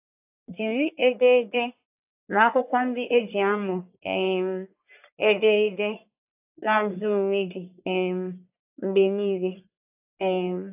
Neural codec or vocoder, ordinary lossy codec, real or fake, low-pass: codec, 44.1 kHz, 1.7 kbps, Pupu-Codec; AAC, 32 kbps; fake; 3.6 kHz